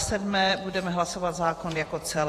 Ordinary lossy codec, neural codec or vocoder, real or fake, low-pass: AAC, 48 kbps; vocoder, 48 kHz, 128 mel bands, Vocos; fake; 14.4 kHz